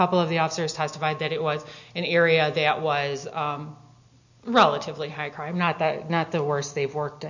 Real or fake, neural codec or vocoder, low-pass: real; none; 7.2 kHz